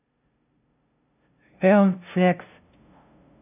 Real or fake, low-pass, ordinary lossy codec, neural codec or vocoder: fake; 3.6 kHz; none; codec, 16 kHz, 0.5 kbps, FunCodec, trained on LibriTTS, 25 frames a second